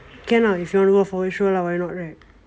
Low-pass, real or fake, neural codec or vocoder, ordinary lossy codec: none; real; none; none